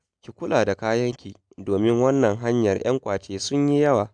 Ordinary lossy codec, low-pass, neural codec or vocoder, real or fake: none; 9.9 kHz; none; real